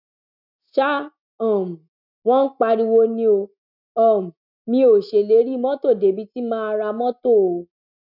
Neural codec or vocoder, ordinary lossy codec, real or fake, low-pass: none; none; real; 5.4 kHz